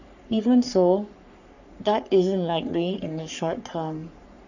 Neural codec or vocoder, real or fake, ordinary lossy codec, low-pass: codec, 44.1 kHz, 3.4 kbps, Pupu-Codec; fake; none; 7.2 kHz